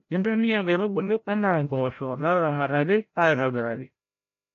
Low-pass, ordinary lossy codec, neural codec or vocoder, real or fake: 7.2 kHz; AAC, 48 kbps; codec, 16 kHz, 0.5 kbps, FreqCodec, larger model; fake